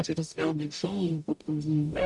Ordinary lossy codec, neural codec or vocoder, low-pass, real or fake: MP3, 96 kbps; codec, 44.1 kHz, 0.9 kbps, DAC; 10.8 kHz; fake